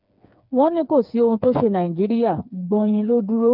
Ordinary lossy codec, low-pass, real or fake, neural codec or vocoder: none; 5.4 kHz; fake; codec, 16 kHz, 4 kbps, FreqCodec, smaller model